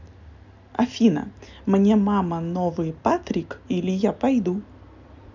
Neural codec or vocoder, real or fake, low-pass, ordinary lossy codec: none; real; 7.2 kHz; none